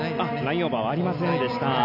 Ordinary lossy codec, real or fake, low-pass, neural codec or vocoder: none; real; 5.4 kHz; none